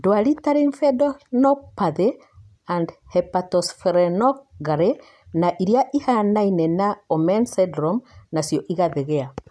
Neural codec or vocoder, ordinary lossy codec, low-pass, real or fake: none; none; none; real